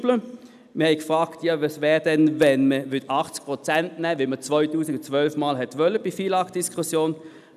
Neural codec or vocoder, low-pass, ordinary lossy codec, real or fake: none; 14.4 kHz; none; real